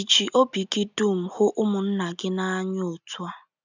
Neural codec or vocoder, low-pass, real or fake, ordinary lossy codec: none; 7.2 kHz; real; none